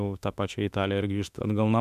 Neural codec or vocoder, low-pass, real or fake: autoencoder, 48 kHz, 32 numbers a frame, DAC-VAE, trained on Japanese speech; 14.4 kHz; fake